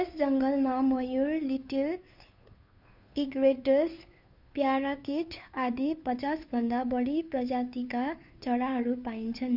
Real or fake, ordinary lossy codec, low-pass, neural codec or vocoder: fake; none; 5.4 kHz; codec, 16 kHz, 2 kbps, FunCodec, trained on Chinese and English, 25 frames a second